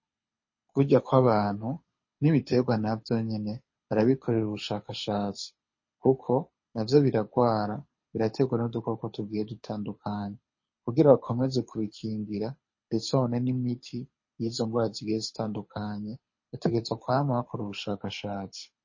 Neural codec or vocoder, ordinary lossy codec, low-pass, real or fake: codec, 24 kHz, 6 kbps, HILCodec; MP3, 32 kbps; 7.2 kHz; fake